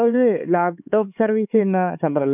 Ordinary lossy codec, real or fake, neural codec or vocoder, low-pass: none; fake; codec, 16 kHz, 4 kbps, X-Codec, HuBERT features, trained on LibriSpeech; 3.6 kHz